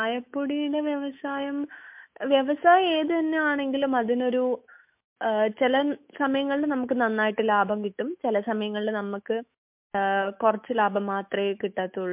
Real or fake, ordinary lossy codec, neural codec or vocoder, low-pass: fake; MP3, 32 kbps; autoencoder, 48 kHz, 128 numbers a frame, DAC-VAE, trained on Japanese speech; 3.6 kHz